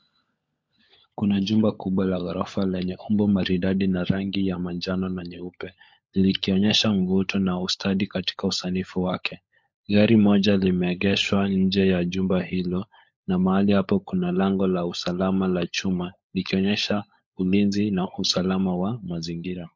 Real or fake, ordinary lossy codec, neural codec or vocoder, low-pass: fake; MP3, 48 kbps; codec, 16 kHz, 16 kbps, FunCodec, trained on LibriTTS, 50 frames a second; 7.2 kHz